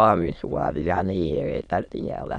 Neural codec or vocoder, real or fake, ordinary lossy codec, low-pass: autoencoder, 22.05 kHz, a latent of 192 numbers a frame, VITS, trained on many speakers; fake; none; 9.9 kHz